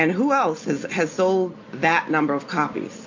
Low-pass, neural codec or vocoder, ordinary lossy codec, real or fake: 7.2 kHz; none; MP3, 48 kbps; real